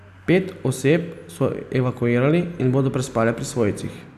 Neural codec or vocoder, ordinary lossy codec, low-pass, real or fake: none; none; 14.4 kHz; real